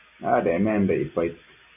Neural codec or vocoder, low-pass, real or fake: none; 3.6 kHz; real